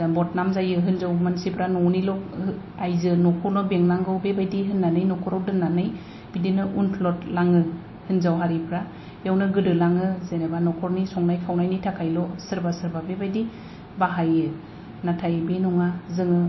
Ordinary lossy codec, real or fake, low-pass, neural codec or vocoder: MP3, 24 kbps; real; 7.2 kHz; none